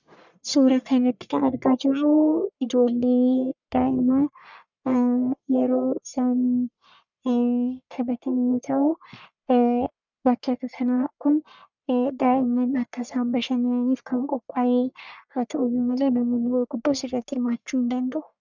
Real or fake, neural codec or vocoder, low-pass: fake; codec, 44.1 kHz, 1.7 kbps, Pupu-Codec; 7.2 kHz